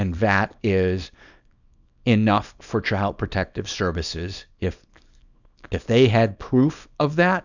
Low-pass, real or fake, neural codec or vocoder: 7.2 kHz; fake; codec, 24 kHz, 0.9 kbps, WavTokenizer, small release